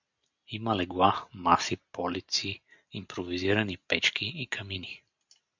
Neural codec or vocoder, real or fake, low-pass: none; real; 7.2 kHz